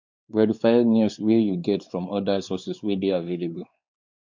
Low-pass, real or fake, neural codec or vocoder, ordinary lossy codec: 7.2 kHz; fake; codec, 16 kHz, 4 kbps, X-Codec, WavLM features, trained on Multilingual LibriSpeech; MP3, 64 kbps